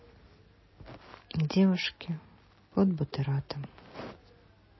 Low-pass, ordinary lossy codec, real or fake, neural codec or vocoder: 7.2 kHz; MP3, 24 kbps; real; none